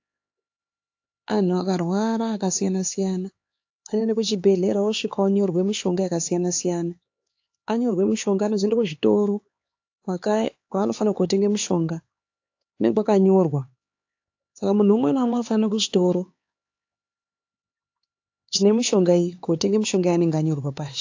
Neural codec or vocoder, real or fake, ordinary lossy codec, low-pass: codec, 16 kHz, 4 kbps, X-Codec, HuBERT features, trained on LibriSpeech; fake; AAC, 48 kbps; 7.2 kHz